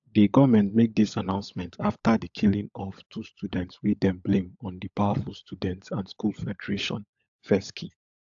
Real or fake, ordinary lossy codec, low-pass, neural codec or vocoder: fake; AAC, 64 kbps; 7.2 kHz; codec, 16 kHz, 16 kbps, FunCodec, trained on LibriTTS, 50 frames a second